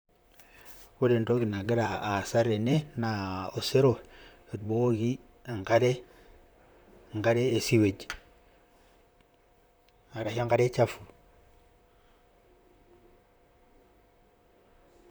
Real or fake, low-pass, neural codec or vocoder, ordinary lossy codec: fake; none; vocoder, 44.1 kHz, 128 mel bands, Pupu-Vocoder; none